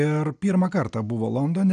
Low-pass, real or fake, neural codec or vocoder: 9.9 kHz; real; none